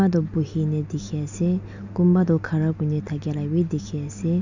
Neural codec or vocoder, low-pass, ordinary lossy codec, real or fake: none; 7.2 kHz; none; real